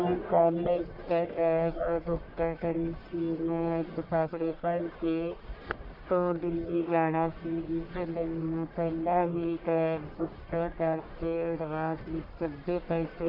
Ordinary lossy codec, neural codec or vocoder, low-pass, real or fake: AAC, 48 kbps; codec, 44.1 kHz, 1.7 kbps, Pupu-Codec; 5.4 kHz; fake